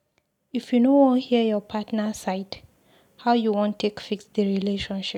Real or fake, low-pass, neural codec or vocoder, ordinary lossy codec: real; 19.8 kHz; none; none